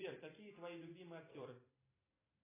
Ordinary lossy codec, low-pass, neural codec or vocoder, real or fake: AAC, 24 kbps; 3.6 kHz; vocoder, 44.1 kHz, 128 mel bands every 256 samples, BigVGAN v2; fake